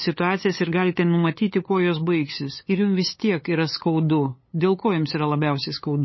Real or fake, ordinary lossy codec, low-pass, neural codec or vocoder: fake; MP3, 24 kbps; 7.2 kHz; codec, 16 kHz, 8 kbps, FunCodec, trained on LibriTTS, 25 frames a second